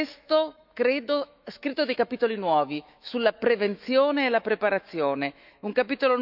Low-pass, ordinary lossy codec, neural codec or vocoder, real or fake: 5.4 kHz; none; autoencoder, 48 kHz, 128 numbers a frame, DAC-VAE, trained on Japanese speech; fake